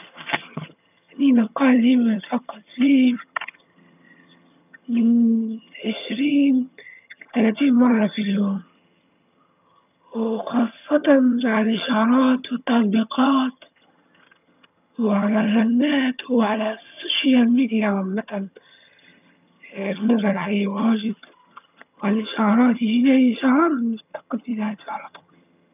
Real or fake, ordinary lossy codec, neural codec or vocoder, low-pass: fake; none; vocoder, 22.05 kHz, 80 mel bands, HiFi-GAN; 3.6 kHz